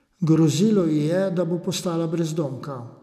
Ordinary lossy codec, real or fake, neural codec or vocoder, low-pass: none; real; none; 14.4 kHz